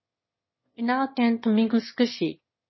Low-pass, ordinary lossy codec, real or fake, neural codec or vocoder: 7.2 kHz; MP3, 24 kbps; fake; autoencoder, 22.05 kHz, a latent of 192 numbers a frame, VITS, trained on one speaker